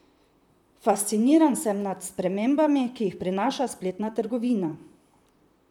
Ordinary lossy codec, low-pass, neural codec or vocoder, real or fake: none; 19.8 kHz; vocoder, 44.1 kHz, 128 mel bands, Pupu-Vocoder; fake